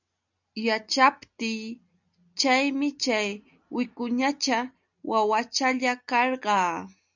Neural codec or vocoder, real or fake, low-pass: none; real; 7.2 kHz